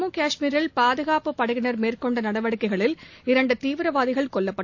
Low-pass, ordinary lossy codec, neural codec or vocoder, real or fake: 7.2 kHz; AAC, 48 kbps; none; real